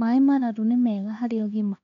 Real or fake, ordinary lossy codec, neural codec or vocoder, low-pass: fake; none; codec, 16 kHz, 2 kbps, X-Codec, HuBERT features, trained on LibriSpeech; 7.2 kHz